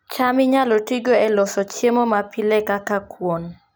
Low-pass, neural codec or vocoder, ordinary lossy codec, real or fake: none; none; none; real